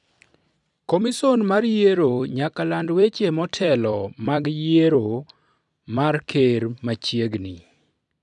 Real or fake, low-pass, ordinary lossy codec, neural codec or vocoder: fake; 10.8 kHz; none; vocoder, 44.1 kHz, 128 mel bands every 256 samples, BigVGAN v2